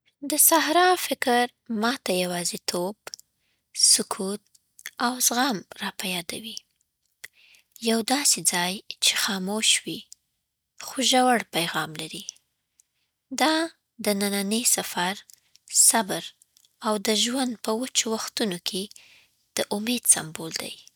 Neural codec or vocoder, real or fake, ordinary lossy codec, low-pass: none; real; none; none